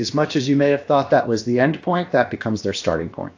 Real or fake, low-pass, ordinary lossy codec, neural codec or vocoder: fake; 7.2 kHz; AAC, 48 kbps; codec, 16 kHz, about 1 kbps, DyCAST, with the encoder's durations